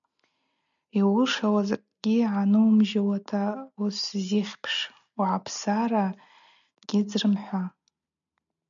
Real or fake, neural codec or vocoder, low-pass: real; none; 7.2 kHz